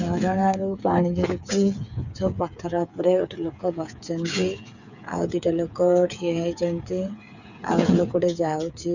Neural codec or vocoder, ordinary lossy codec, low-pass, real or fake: codec, 16 kHz, 8 kbps, FreqCodec, smaller model; none; 7.2 kHz; fake